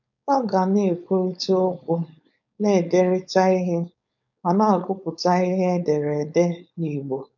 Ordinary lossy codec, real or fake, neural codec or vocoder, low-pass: none; fake; codec, 16 kHz, 4.8 kbps, FACodec; 7.2 kHz